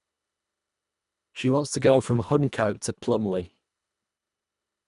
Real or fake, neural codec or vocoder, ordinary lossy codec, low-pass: fake; codec, 24 kHz, 1.5 kbps, HILCodec; none; 10.8 kHz